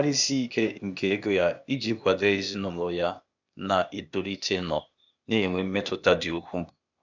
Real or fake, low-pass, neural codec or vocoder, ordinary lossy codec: fake; 7.2 kHz; codec, 16 kHz, 0.8 kbps, ZipCodec; none